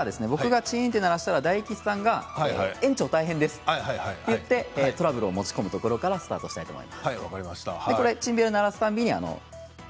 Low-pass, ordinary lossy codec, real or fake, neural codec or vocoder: none; none; real; none